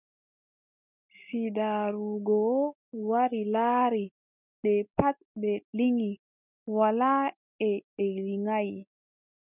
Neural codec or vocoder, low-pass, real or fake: none; 3.6 kHz; real